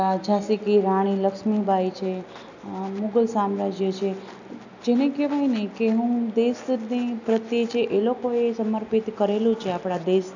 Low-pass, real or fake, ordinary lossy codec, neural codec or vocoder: 7.2 kHz; real; none; none